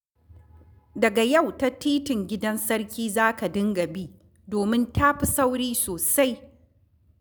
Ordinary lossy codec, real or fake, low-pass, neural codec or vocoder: none; real; none; none